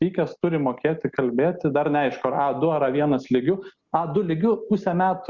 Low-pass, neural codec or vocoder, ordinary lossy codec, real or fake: 7.2 kHz; none; Opus, 64 kbps; real